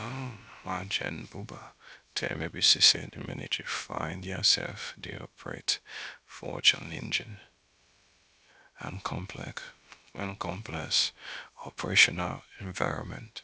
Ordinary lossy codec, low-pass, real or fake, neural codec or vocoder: none; none; fake; codec, 16 kHz, about 1 kbps, DyCAST, with the encoder's durations